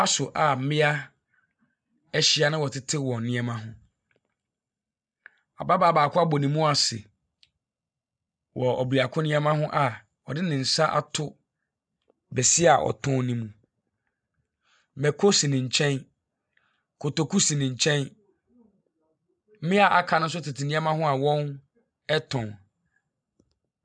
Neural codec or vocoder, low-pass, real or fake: none; 9.9 kHz; real